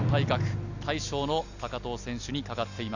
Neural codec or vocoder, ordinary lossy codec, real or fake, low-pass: none; none; real; 7.2 kHz